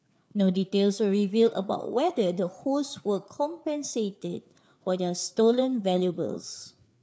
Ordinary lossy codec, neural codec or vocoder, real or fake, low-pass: none; codec, 16 kHz, 4 kbps, FreqCodec, larger model; fake; none